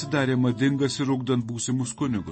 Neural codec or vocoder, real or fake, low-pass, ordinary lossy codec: none; real; 10.8 kHz; MP3, 32 kbps